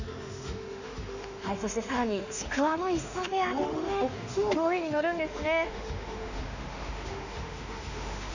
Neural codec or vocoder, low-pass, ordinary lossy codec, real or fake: autoencoder, 48 kHz, 32 numbers a frame, DAC-VAE, trained on Japanese speech; 7.2 kHz; none; fake